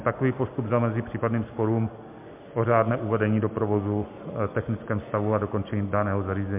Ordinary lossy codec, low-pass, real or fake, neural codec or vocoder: MP3, 32 kbps; 3.6 kHz; real; none